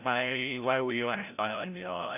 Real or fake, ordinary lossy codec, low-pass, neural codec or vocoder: fake; none; 3.6 kHz; codec, 16 kHz, 0.5 kbps, FreqCodec, larger model